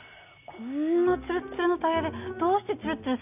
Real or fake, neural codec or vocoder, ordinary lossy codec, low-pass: real; none; none; 3.6 kHz